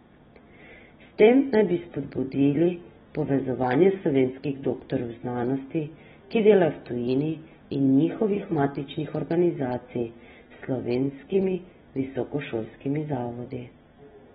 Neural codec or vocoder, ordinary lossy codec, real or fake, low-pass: none; AAC, 16 kbps; real; 7.2 kHz